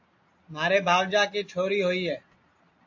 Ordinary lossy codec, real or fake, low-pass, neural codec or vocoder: AAC, 48 kbps; real; 7.2 kHz; none